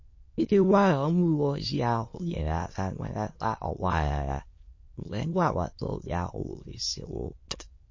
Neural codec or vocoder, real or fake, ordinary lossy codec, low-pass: autoencoder, 22.05 kHz, a latent of 192 numbers a frame, VITS, trained on many speakers; fake; MP3, 32 kbps; 7.2 kHz